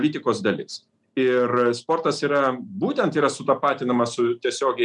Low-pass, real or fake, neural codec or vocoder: 10.8 kHz; real; none